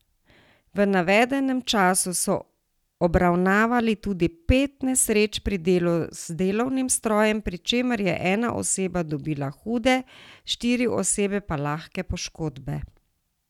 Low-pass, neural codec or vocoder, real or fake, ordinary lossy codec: 19.8 kHz; none; real; none